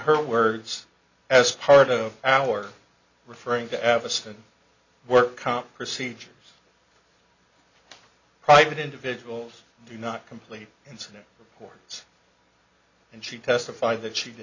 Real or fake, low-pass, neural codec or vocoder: real; 7.2 kHz; none